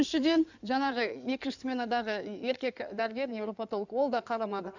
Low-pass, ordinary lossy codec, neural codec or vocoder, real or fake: 7.2 kHz; none; codec, 16 kHz in and 24 kHz out, 2.2 kbps, FireRedTTS-2 codec; fake